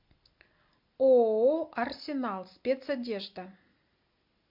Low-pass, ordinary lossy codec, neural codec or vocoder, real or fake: 5.4 kHz; MP3, 48 kbps; none; real